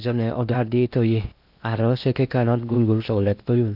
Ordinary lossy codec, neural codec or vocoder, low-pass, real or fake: none; codec, 16 kHz in and 24 kHz out, 0.8 kbps, FocalCodec, streaming, 65536 codes; 5.4 kHz; fake